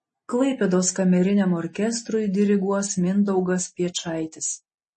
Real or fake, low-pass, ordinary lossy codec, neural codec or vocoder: real; 10.8 kHz; MP3, 32 kbps; none